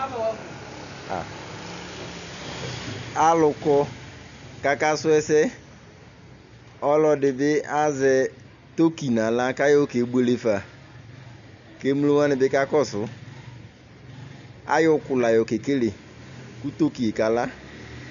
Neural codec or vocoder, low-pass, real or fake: none; 7.2 kHz; real